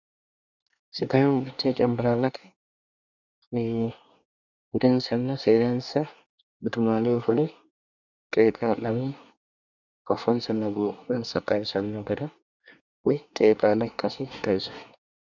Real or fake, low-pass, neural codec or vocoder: fake; 7.2 kHz; codec, 24 kHz, 1 kbps, SNAC